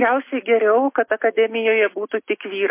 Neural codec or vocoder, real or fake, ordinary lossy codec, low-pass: none; real; MP3, 24 kbps; 3.6 kHz